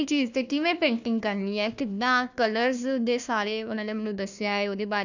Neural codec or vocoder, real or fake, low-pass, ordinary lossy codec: codec, 16 kHz, 1 kbps, FunCodec, trained on Chinese and English, 50 frames a second; fake; 7.2 kHz; none